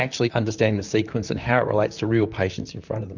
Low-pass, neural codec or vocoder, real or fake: 7.2 kHz; vocoder, 44.1 kHz, 128 mel bands, Pupu-Vocoder; fake